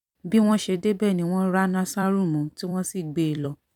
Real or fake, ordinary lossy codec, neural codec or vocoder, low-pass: fake; none; vocoder, 44.1 kHz, 128 mel bands every 256 samples, BigVGAN v2; 19.8 kHz